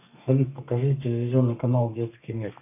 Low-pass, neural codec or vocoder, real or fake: 3.6 kHz; codec, 32 kHz, 1.9 kbps, SNAC; fake